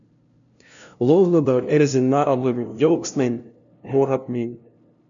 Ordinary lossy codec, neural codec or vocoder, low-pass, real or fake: MP3, 96 kbps; codec, 16 kHz, 0.5 kbps, FunCodec, trained on LibriTTS, 25 frames a second; 7.2 kHz; fake